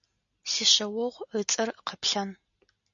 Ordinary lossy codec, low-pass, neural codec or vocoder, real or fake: MP3, 96 kbps; 7.2 kHz; none; real